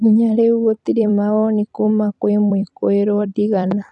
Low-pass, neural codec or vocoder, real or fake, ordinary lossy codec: 10.8 kHz; vocoder, 44.1 kHz, 128 mel bands every 256 samples, BigVGAN v2; fake; none